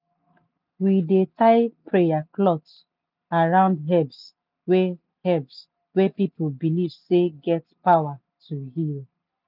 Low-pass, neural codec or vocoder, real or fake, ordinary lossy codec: 5.4 kHz; none; real; none